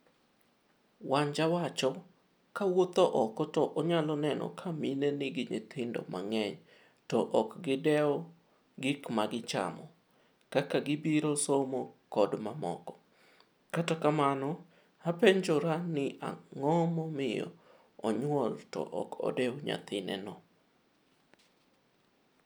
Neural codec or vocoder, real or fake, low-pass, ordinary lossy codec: none; real; none; none